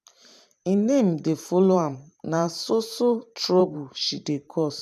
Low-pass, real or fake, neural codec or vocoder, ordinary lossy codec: 14.4 kHz; fake; vocoder, 44.1 kHz, 128 mel bands every 512 samples, BigVGAN v2; none